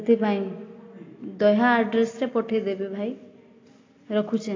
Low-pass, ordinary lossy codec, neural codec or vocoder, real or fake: 7.2 kHz; AAC, 32 kbps; none; real